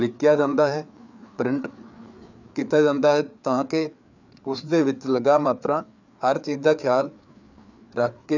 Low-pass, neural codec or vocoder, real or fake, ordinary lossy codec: 7.2 kHz; codec, 16 kHz, 4 kbps, FreqCodec, larger model; fake; none